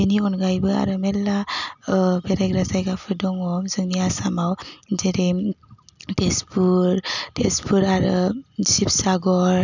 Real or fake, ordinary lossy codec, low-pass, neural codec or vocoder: real; none; 7.2 kHz; none